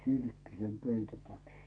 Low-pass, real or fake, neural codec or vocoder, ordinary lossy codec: 9.9 kHz; fake; codec, 44.1 kHz, 2.6 kbps, SNAC; AAC, 48 kbps